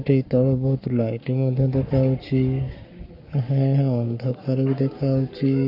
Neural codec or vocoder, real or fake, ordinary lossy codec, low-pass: codec, 44.1 kHz, 7.8 kbps, Pupu-Codec; fake; none; 5.4 kHz